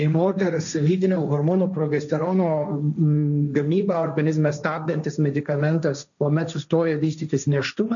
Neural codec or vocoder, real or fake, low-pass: codec, 16 kHz, 1.1 kbps, Voila-Tokenizer; fake; 7.2 kHz